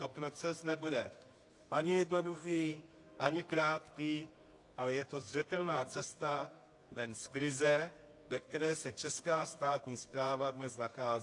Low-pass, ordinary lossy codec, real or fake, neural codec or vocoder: 10.8 kHz; AAC, 48 kbps; fake; codec, 24 kHz, 0.9 kbps, WavTokenizer, medium music audio release